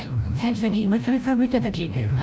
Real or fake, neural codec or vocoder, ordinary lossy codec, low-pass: fake; codec, 16 kHz, 0.5 kbps, FreqCodec, larger model; none; none